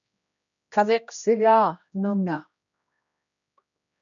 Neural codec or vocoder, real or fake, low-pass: codec, 16 kHz, 1 kbps, X-Codec, HuBERT features, trained on general audio; fake; 7.2 kHz